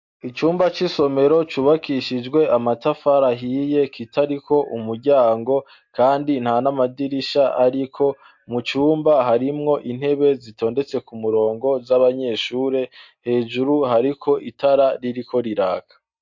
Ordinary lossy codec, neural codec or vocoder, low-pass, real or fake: MP3, 48 kbps; none; 7.2 kHz; real